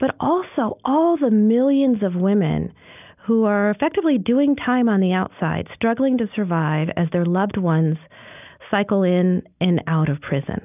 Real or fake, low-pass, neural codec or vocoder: real; 3.6 kHz; none